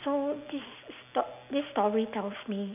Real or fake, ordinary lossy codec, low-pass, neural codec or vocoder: real; none; 3.6 kHz; none